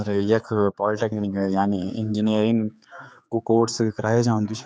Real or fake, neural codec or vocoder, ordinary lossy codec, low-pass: fake; codec, 16 kHz, 4 kbps, X-Codec, HuBERT features, trained on general audio; none; none